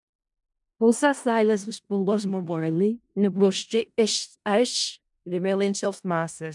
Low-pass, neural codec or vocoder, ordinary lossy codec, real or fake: 10.8 kHz; codec, 16 kHz in and 24 kHz out, 0.4 kbps, LongCat-Audio-Codec, four codebook decoder; MP3, 96 kbps; fake